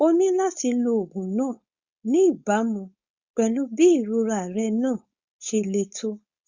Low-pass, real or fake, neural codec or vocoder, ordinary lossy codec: 7.2 kHz; fake; codec, 16 kHz, 4.8 kbps, FACodec; Opus, 64 kbps